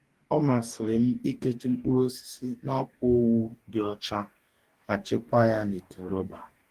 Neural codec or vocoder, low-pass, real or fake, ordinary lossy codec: codec, 44.1 kHz, 2.6 kbps, DAC; 14.4 kHz; fake; Opus, 24 kbps